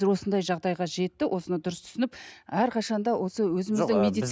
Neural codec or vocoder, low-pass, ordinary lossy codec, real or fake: none; none; none; real